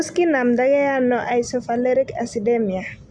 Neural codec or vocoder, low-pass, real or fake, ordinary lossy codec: none; 9.9 kHz; real; Opus, 64 kbps